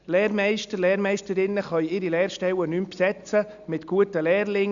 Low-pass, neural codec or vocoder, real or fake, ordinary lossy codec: 7.2 kHz; none; real; Opus, 64 kbps